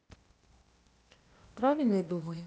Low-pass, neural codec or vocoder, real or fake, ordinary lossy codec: none; codec, 16 kHz, 0.8 kbps, ZipCodec; fake; none